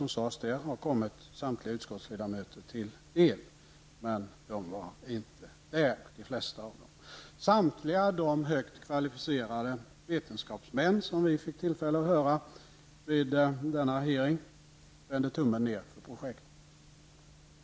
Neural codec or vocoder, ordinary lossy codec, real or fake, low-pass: none; none; real; none